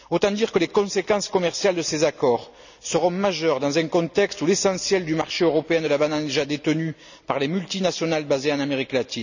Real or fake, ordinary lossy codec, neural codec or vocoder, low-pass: real; none; none; 7.2 kHz